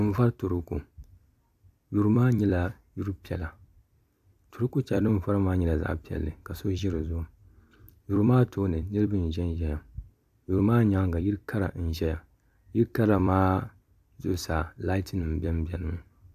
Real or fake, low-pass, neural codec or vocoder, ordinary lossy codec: fake; 14.4 kHz; vocoder, 44.1 kHz, 128 mel bands every 256 samples, BigVGAN v2; AAC, 96 kbps